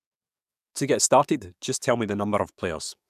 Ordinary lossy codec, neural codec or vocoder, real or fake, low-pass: none; codec, 44.1 kHz, 7.8 kbps, DAC; fake; 14.4 kHz